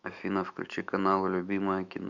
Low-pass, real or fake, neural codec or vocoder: 7.2 kHz; fake; autoencoder, 48 kHz, 128 numbers a frame, DAC-VAE, trained on Japanese speech